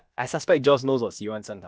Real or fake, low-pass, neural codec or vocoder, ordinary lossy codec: fake; none; codec, 16 kHz, about 1 kbps, DyCAST, with the encoder's durations; none